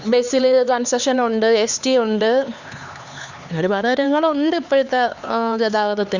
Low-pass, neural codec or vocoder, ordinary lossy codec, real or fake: 7.2 kHz; codec, 16 kHz, 4 kbps, X-Codec, HuBERT features, trained on LibriSpeech; Opus, 64 kbps; fake